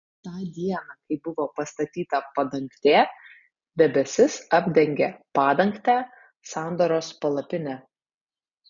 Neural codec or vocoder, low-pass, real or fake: none; 7.2 kHz; real